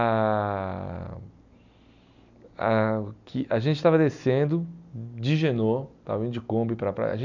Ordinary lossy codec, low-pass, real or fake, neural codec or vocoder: none; 7.2 kHz; real; none